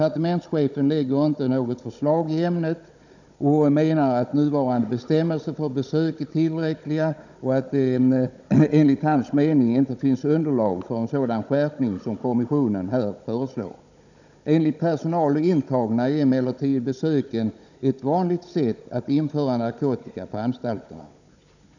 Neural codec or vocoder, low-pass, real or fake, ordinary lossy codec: codec, 16 kHz, 16 kbps, FunCodec, trained on Chinese and English, 50 frames a second; 7.2 kHz; fake; none